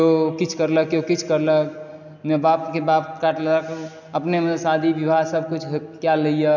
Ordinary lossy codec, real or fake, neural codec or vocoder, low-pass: none; real; none; 7.2 kHz